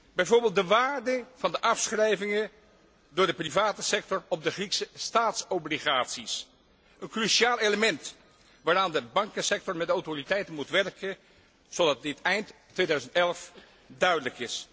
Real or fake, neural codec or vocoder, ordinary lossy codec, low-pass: real; none; none; none